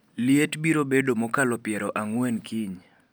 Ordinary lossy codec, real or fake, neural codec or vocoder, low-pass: none; real; none; none